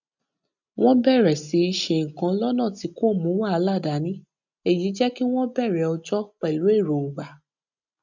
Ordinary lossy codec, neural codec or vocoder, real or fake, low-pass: none; none; real; 7.2 kHz